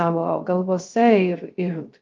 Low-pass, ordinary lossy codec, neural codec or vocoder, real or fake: 7.2 kHz; Opus, 32 kbps; codec, 16 kHz, 0.3 kbps, FocalCodec; fake